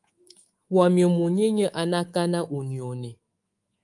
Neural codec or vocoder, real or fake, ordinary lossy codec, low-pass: codec, 24 kHz, 3.1 kbps, DualCodec; fake; Opus, 32 kbps; 10.8 kHz